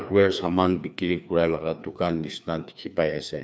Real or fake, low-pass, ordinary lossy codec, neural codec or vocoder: fake; none; none; codec, 16 kHz, 2 kbps, FreqCodec, larger model